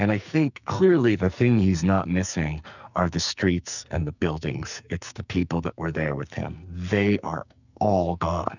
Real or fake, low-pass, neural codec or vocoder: fake; 7.2 kHz; codec, 44.1 kHz, 2.6 kbps, SNAC